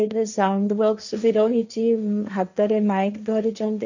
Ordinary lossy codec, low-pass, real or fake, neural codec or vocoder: none; 7.2 kHz; fake; codec, 16 kHz, 1.1 kbps, Voila-Tokenizer